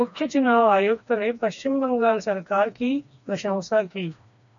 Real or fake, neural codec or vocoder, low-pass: fake; codec, 16 kHz, 2 kbps, FreqCodec, smaller model; 7.2 kHz